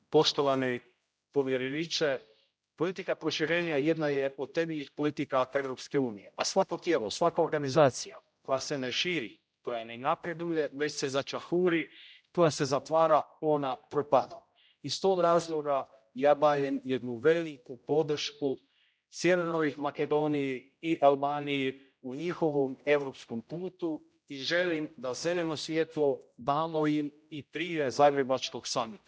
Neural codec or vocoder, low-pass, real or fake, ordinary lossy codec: codec, 16 kHz, 0.5 kbps, X-Codec, HuBERT features, trained on general audio; none; fake; none